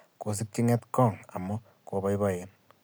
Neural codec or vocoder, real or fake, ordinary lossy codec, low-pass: none; real; none; none